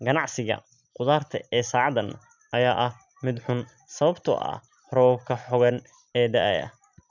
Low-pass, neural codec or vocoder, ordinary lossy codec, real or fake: 7.2 kHz; none; none; real